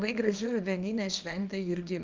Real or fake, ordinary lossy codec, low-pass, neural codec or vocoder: fake; Opus, 24 kbps; 7.2 kHz; codec, 24 kHz, 0.9 kbps, WavTokenizer, small release